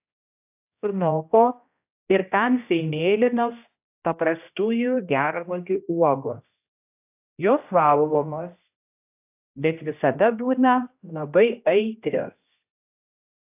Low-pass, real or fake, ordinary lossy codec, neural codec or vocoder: 3.6 kHz; fake; AAC, 32 kbps; codec, 16 kHz, 1 kbps, X-Codec, HuBERT features, trained on general audio